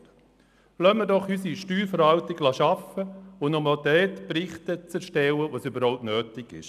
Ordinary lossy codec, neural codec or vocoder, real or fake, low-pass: none; none; real; 14.4 kHz